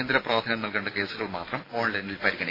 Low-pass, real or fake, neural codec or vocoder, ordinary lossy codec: 5.4 kHz; real; none; AAC, 24 kbps